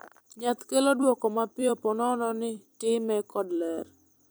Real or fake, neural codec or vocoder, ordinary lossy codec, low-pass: fake; vocoder, 44.1 kHz, 128 mel bands every 256 samples, BigVGAN v2; none; none